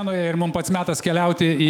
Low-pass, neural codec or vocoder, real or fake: 19.8 kHz; autoencoder, 48 kHz, 128 numbers a frame, DAC-VAE, trained on Japanese speech; fake